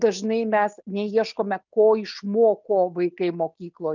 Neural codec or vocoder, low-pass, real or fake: none; 7.2 kHz; real